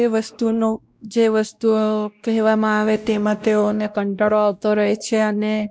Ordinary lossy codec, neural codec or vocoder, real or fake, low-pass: none; codec, 16 kHz, 1 kbps, X-Codec, WavLM features, trained on Multilingual LibriSpeech; fake; none